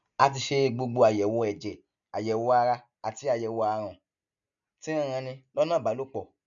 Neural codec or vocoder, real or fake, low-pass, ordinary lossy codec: none; real; 7.2 kHz; none